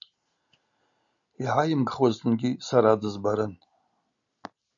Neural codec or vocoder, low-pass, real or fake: none; 7.2 kHz; real